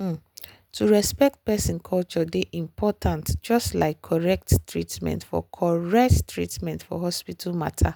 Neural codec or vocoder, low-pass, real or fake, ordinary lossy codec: none; none; real; none